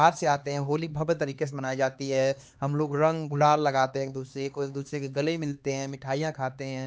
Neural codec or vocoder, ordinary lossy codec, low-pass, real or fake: codec, 16 kHz, 2 kbps, X-Codec, HuBERT features, trained on LibriSpeech; none; none; fake